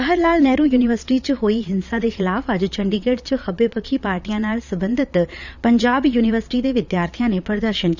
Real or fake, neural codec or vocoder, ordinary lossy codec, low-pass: fake; vocoder, 22.05 kHz, 80 mel bands, Vocos; none; 7.2 kHz